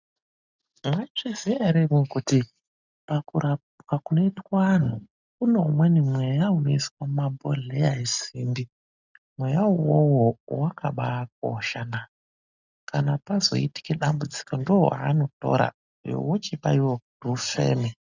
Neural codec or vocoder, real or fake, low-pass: none; real; 7.2 kHz